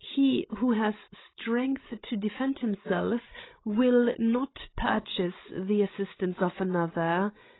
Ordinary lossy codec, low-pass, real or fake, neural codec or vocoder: AAC, 16 kbps; 7.2 kHz; fake; codec, 16 kHz, 16 kbps, FreqCodec, larger model